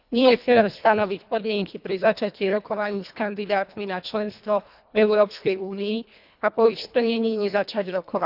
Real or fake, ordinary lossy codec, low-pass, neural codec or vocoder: fake; none; 5.4 kHz; codec, 24 kHz, 1.5 kbps, HILCodec